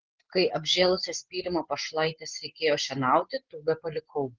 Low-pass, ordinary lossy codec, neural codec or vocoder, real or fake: 7.2 kHz; Opus, 16 kbps; none; real